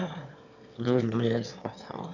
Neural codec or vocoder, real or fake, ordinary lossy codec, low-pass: autoencoder, 22.05 kHz, a latent of 192 numbers a frame, VITS, trained on one speaker; fake; none; 7.2 kHz